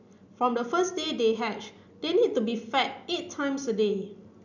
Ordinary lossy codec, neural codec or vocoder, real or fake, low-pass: none; none; real; 7.2 kHz